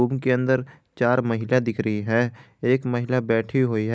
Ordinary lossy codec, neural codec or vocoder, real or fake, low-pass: none; none; real; none